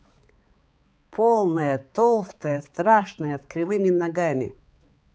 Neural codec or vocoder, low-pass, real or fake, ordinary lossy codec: codec, 16 kHz, 4 kbps, X-Codec, HuBERT features, trained on balanced general audio; none; fake; none